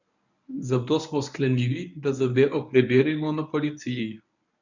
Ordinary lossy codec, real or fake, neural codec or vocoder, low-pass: none; fake; codec, 24 kHz, 0.9 kbps, WavTokenizer, medium speech release version 1; 7.2 kHz